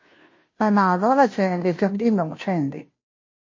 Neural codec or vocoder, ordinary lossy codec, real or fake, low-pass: codec, 16 kHz, 0.5 kbps, FunCodec, trained on Chinese and English, 25 frames a second; MP3, 32 kbps; fake; 7.2 kHz